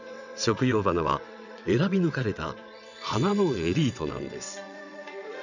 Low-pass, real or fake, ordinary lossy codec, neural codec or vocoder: 7.2 kHz; fake; none; vocoder, 22.05 kHz, 80 mel bands, WaveNeXt